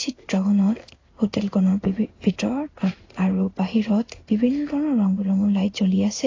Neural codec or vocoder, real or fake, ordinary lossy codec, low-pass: codec, 16 kHz in and 24 kHz out, 1 kbps, XY-Tokenizer; fake; AAC, 32 kbps; 7.2 kHz